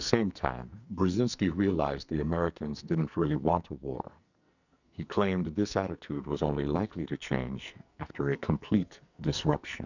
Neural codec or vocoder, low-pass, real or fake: codec, 44.1 kHz, 2.6 kbps, SNAC; 7.2 kHz; fake